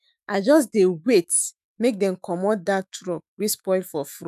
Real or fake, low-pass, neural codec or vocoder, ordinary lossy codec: fake; 14.4 kHz; autoencoder, 48 kHz, 128 numbers a frame, DAC-VAE, trained on Japanese speech; none